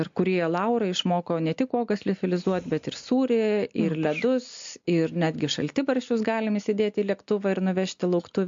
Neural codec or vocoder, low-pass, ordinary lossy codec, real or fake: none; 7.2 kHz; MP3, 48 kbps; real